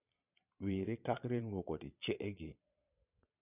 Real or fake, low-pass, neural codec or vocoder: fake; 3.6 kHz; vocoder, 22.05 kHz, 80 mel bands, Vocos